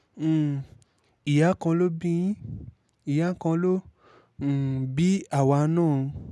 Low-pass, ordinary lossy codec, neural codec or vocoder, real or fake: none; none; none; real